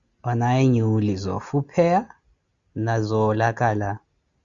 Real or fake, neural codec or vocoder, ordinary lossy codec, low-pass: real; none; Opus, 64 kbps; 7.2 kHz